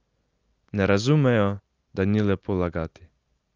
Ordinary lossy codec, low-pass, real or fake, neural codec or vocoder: Opus, 24 kbps; 7.2 kHz; real; none